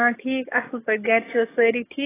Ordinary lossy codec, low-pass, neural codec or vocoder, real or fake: AAC, 16 kbps; 3.6 kHz; codec, 44.1 kHz, 7.8 kbps, DAC; fake